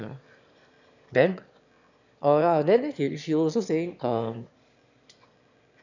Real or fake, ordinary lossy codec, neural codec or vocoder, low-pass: fake; none; autoencoder, 22.05 kHz, a latent of 192 numbers a frame, VITS, trained on one speaker; 7.2 kHz